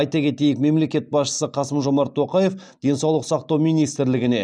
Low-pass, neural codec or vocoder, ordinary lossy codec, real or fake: none; none; none; real